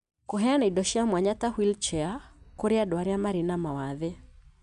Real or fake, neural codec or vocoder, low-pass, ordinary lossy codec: real; none; 10.8 kHz; none